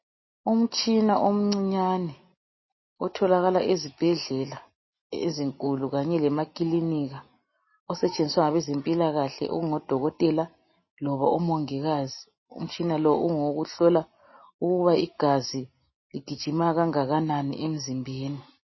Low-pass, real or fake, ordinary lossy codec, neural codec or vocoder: 7.2 kHz; real; MP3, 24 kbps; none